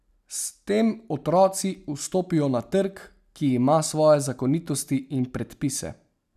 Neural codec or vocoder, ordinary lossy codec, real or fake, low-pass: none; none; real; 14.4 kHz